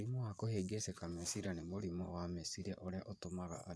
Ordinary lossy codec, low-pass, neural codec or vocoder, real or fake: none; none; vocoder, 22.05 kHz, 80 mel bands, Vocos; fake